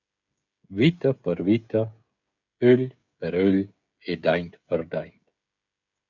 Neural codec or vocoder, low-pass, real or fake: codec, 16 kHz, 16 kbps, FreqCodec, smaller model; 7.2 kHz; fake